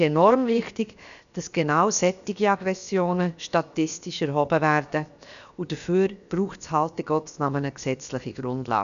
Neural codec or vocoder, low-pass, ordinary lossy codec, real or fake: codec, 16 kHz, 0.7 kbps, FocalCodec; 7.2 kHz; none; fake